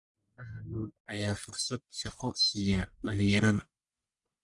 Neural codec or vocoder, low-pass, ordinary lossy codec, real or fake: codec, 44.1 kHz, 1.7 kbps, Pupu-Codec; 10.8 kHz; Opus, 64 kbps; fake